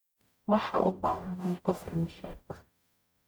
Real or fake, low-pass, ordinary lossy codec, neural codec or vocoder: fake; none; none; codec, 44.1 kHz, 0.9 kbps, DAC